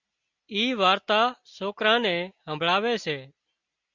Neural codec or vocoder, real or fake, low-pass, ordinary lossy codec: none; real; 7.2 kHz; Opus, 64 kbps